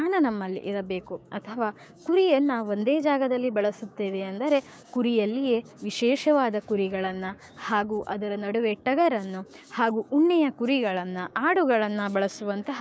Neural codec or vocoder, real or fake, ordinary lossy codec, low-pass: codec, 16 kHz, 6 kbps, DAC; fake; none; none